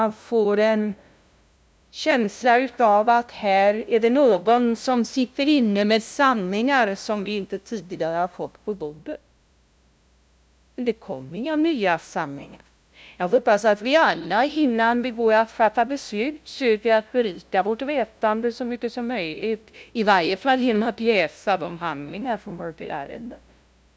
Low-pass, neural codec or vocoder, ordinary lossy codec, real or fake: none; codec, 16 kHz, 0.5 kbps, FunCodec, trained on LibriTTS, 25 frames a second; none; fake